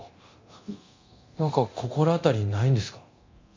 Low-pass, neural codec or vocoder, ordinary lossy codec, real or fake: 7.2 kHz; codec, 24 kHz, 0.9 kbps, DualCodec; MP3, 48 kbps; fake